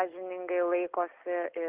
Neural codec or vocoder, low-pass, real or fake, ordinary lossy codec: none; 3.6 kHz; real; Opus, 16 kbps